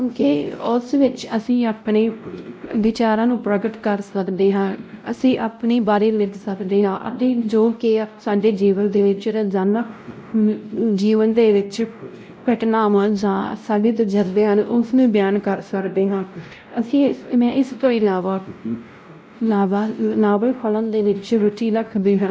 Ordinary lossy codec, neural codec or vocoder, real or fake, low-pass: none; codec, 16 kHz, 0.5 kbps, X-Codec, WavLM features, trained on Multilingual LibriSpeech; fake; none